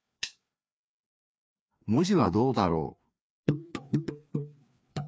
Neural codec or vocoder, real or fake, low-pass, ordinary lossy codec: codec, 16 kHz, 2 kbps, FreqCodec, larger model; fake; none; none